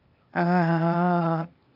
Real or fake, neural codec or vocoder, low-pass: fake; codec, 16 kHz, 0.8 kbps, ZipCodec; 5.4 kHz